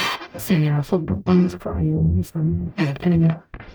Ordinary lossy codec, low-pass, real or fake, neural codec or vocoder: none; none; fake; codec, 44.1 kHz, 0.9 kbps, DAC